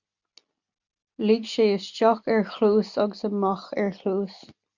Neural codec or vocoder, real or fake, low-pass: none; real; 7.2 kHz